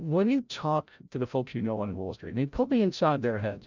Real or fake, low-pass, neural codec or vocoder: fake; 7.2 kHz; codec, 16 kHz, 0.5 kbps, FreqCodec, larger model